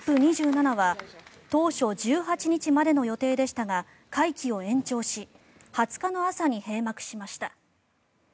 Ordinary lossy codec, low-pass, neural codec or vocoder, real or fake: none; none; none; real